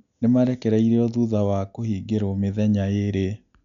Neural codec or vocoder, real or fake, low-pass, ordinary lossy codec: none; real; 7.2 kHz; none